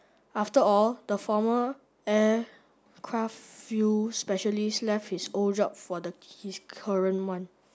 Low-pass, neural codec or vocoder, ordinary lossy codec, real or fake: none; none; none; real